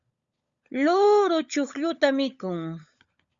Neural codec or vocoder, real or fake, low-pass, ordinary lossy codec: codec, 16 kHz, 16 kbps, FunCodec, trained on LibriTTS, 50 frames a second; fake; 7.2 kHz; Opus, 64 kbps